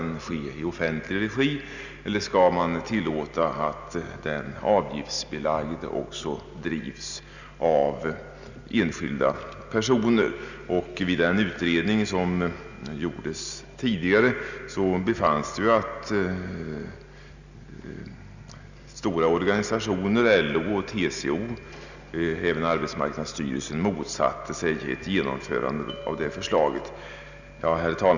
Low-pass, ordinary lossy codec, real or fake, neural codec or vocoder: 7.2 kHz; none; real; none